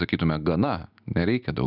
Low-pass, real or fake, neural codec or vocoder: 5.4 kHz; real; none